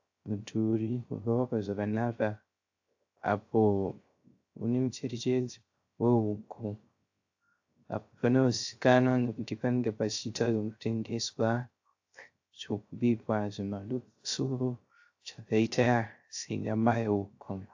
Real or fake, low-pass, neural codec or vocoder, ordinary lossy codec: fake; 7.2 kHz; codec, 16 kHz, 0.3 kbps, FocalCodec; AAC, 48 kbps